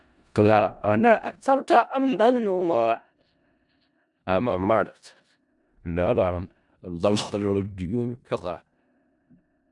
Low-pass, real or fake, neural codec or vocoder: 10.8 kHz; fake; codec, 16 kHz in and 24 kHz out, 0.4 kbps, LongCat-Audio-Codec, four codebook decoder